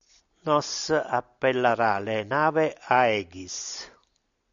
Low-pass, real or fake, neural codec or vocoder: 7.2 kHz; real; none